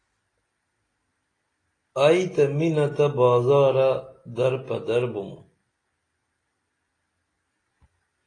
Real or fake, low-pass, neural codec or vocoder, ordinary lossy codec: real; 9.9 kHz; none; AAC, 32 kbps